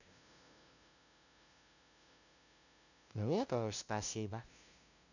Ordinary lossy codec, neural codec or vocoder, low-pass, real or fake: none; codec, 16 kHz, 0.5 kbps, FunCodec, trained on LibriTTS, 25 frames a second; 7.2 kHz; fake